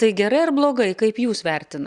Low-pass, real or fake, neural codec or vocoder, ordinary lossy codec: 10.8 kHz; real; none; Opus, 64 kbps